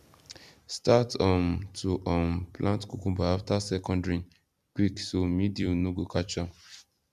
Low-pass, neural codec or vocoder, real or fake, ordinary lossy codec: 14.4 kHz; vocoder, 44.1 kHz, 128 mel bands every 256 samples, BigVGAN v2; fake; none